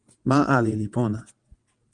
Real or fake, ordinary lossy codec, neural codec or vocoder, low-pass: fake; Opus, 32 kbps; vocoder, 22.05 kHz, 80 mel bands, WaveNeXt; 9.9 kHz